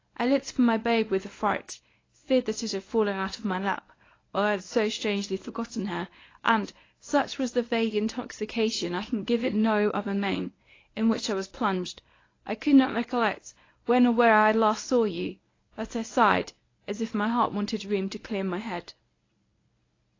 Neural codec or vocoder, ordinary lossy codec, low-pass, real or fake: codec, 24 kHz, 0.9 kbps, WavTokenizer, medium speech release version 1; AAC, 32 kbps; 7.2 kHz; fake